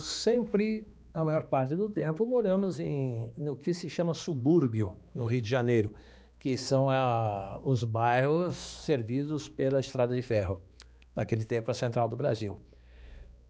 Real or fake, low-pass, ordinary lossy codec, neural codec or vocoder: fake; none; none; codec, 16 kHz, 2 kbps, X-Codec, HuBERT features, trained on balanced general audio